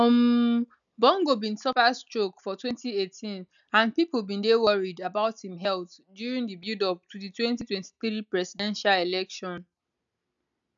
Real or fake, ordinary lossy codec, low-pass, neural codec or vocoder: real; none; 7.2 kHz; none